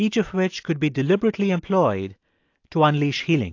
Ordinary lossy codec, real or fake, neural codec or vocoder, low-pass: AAC, 48 kbps; real; none; 7.2 kHz